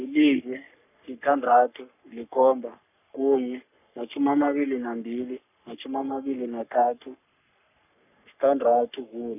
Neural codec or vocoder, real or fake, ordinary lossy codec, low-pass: codec, 44.1 kHz, 3.4 kbps, Pupu-Codec; fake; none; 3.6 kHz